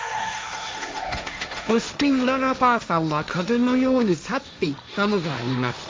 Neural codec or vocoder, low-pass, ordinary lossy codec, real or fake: codec, 16 kHz, 1.1 kbps, Voila-Tokenizer; none; none; fake